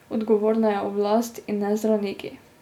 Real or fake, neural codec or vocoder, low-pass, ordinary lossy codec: real; none; 19.8 kHz; none